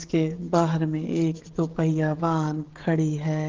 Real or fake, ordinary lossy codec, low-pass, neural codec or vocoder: fake; Opus, 16 kbps; 7.2 kHz; codec, 16 kHz, 16 kbps, FreqCodec, smaller model